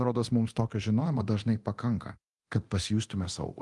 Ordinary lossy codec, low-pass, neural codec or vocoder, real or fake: Opus, 24 kbps; 10.8 kHz; codec, 24 kHz, 0.9 kbps, DualCodec; fake